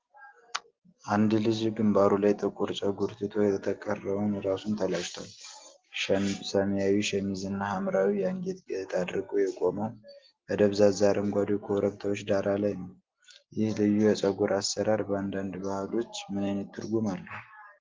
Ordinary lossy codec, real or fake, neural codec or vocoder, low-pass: Opus, 16 kbps; real; none; 7.2 kHz